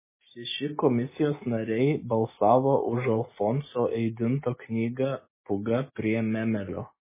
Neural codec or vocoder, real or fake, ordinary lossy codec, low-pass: none; real; MP3, 16 kbps; 3.6 kHz